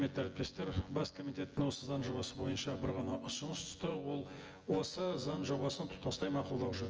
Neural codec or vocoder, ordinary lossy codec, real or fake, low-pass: vocoder, 24 kHz, 100 mel bands, Vocos; Opus, 24 kbps; fake; 7.2 kHz